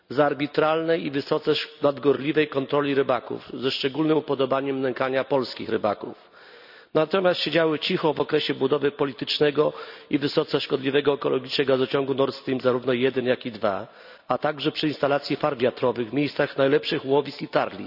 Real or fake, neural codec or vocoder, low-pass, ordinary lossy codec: real; none; 5.4 kHz; none